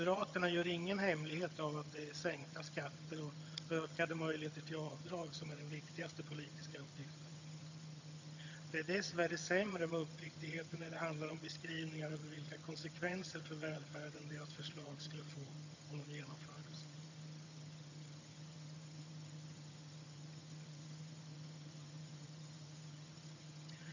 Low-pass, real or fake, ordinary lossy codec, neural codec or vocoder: 7.2 kHz; fake; AAC, 48 kbps; vocoder, 22.05 kHz, 80 mel bands, HiFi-GAN